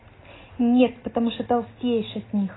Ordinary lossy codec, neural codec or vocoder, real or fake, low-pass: AAC, 16 kbps; none; real; 7.2 kHz